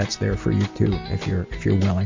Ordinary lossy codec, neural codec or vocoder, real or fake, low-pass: MP3, 64 kbps; none; real; 7.2 kHz